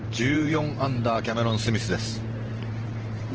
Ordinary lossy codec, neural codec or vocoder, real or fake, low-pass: Opus, 16 kbps; vocoder, 44.1 kHz, 128 mel bands every 512 samples, BigVGAN v2; fake; 7.2 kHz